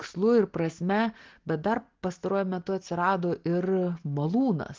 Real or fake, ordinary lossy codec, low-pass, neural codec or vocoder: real; Opus, 16 kbps; 7.2 kHz; none